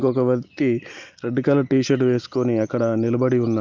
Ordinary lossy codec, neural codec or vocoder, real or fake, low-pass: Opus, 32 kbps; none; real; 7.2 kHz